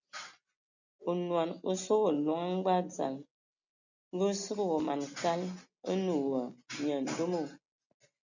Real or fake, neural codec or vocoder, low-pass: real; none; 7.2 kHz